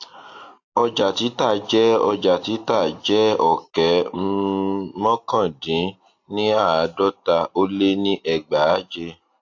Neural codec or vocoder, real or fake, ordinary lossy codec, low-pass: none; real; AAC, 48 kbps; 7.2 kHz